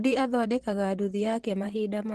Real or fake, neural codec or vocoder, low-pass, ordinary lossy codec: fake; vocoder, 44.1 kHz, 128 mel bands, Pupu-Vocoder; 14.4 kHz; Opus, 16 kbps